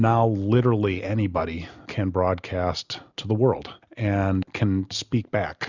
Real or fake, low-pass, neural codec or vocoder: real; 7.2 kHz; none